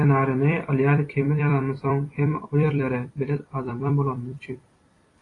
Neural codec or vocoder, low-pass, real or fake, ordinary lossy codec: vocoder, 44.1 kHz, 128 mel bands every 256 samples, BigVGAN v2; 10.8 kHz; fake; AAC, 32 kbps